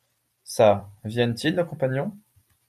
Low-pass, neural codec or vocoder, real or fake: 14.4 kHz; vocoder, 44.1 kHz, 128 mel bands every 256 samples, BigVGAN v2; fake